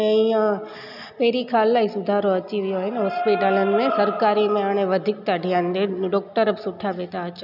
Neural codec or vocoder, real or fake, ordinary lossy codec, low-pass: none; real; none; 5.4 kHz